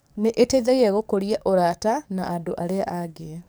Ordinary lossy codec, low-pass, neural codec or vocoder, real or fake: none; none; codec, 44.1 kHz, 7.8 kbps, DAC; fake